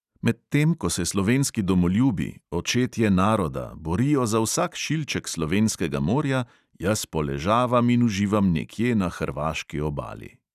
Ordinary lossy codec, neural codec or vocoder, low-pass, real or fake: none; none; 14.4 kHz; real